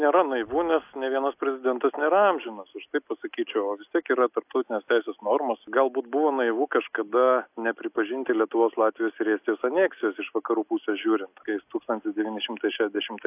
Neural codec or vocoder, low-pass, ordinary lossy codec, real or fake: none; 3.6 kHz; AAC, 32 kbps; real